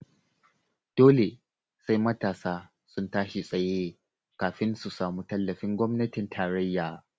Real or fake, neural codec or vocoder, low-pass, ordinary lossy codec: real; none; none; none